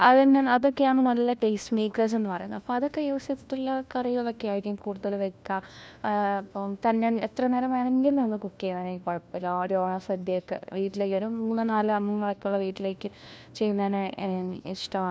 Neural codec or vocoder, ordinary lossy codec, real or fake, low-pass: codec, 16 kHz, 1 kbps, FunCodec, trained on LibriTTS, 50 frames a second; none; fake; none